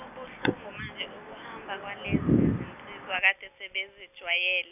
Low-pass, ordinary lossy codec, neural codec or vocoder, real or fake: 3.6 kHz; none; none; real